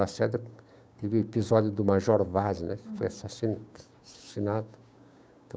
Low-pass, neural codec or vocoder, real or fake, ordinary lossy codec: none; codec, 16 kHz, 6 kbps, DAC; fake; none